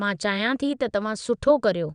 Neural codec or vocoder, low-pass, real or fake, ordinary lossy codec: vocoder, 22.05 kHz, 80 mel bands, WaveNeXt; 9.9 kHz; fake; none